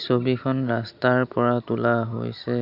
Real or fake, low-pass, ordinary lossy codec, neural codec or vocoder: real; 5.4 kHz; none; none